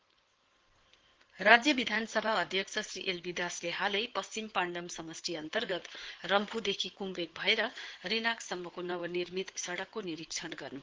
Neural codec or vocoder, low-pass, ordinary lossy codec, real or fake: codec, 16 kHz in and 24 kHz out, 2.2 kbps, FireRedTTS-2 codec; 7.2 kHz; Opus, 16 kbps; fake